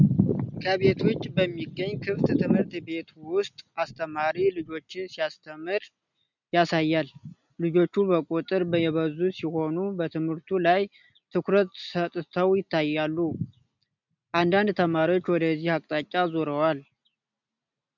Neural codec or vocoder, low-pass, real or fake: none; 7.2 kHz; real